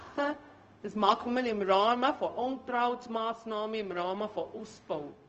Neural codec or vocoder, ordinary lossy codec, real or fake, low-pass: codec, 16 kHz, 0.4 kbps, LongCat-Audio-Codec; Opus, 24 kbps; fake; 7.2 kHz